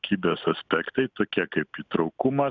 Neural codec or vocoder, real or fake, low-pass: none; real; 7.2 kHz